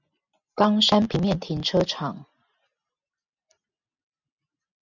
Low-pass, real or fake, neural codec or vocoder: 7.2 kHz; real; none